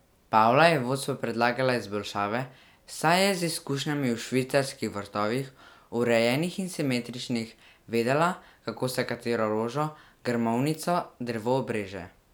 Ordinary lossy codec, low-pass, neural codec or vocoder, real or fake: none; none; none; real